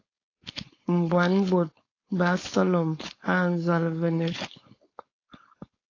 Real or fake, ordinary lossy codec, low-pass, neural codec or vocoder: fake; AAC, 32 kbps; 7.2 kHz; codec, 16 kHz, 4.8 kbps, FACodec